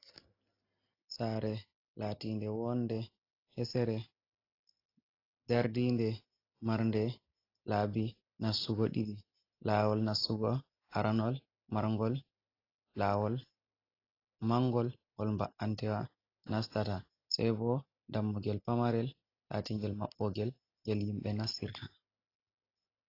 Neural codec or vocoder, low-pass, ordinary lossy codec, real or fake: none; 5.4 kHz; AAC, 32 kbps; real